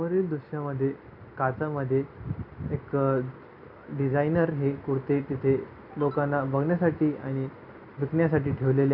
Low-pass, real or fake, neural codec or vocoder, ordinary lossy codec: 5.4 kHz; real; none; none